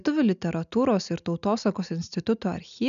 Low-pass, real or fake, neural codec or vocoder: 7.2 kHz; real; none